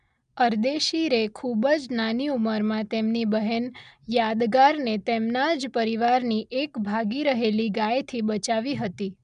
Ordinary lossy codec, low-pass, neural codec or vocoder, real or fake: MP3, 96 kbps; 9.9 kHz; none; real